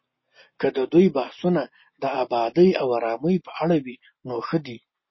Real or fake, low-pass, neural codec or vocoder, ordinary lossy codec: real; 7.2 kHz; none; MP3, 24 kbps